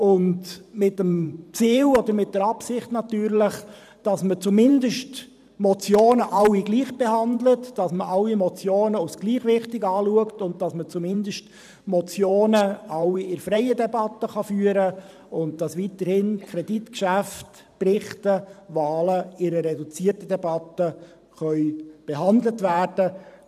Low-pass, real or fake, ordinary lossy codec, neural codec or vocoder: 14.4 kHz; fake; none; vocoder, 44.1 kHz, 128 mel bands every 512 samples, BigVGAN v2